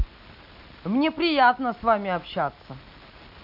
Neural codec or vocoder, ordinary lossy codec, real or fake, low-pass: none; none; real; 5.4 kHz